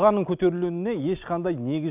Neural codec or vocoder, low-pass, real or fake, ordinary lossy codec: none; 3.6 kHz; real; none